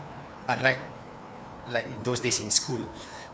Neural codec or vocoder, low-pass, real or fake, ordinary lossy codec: codec, 16 kHz, 2 kbps, FreqCodec, larger model; none; fake; none